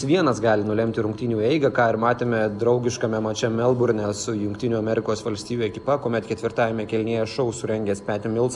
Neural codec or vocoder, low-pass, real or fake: none; 10.8 kHz; real